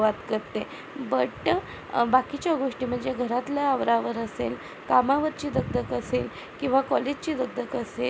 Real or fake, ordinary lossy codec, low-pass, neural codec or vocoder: real; none; none; none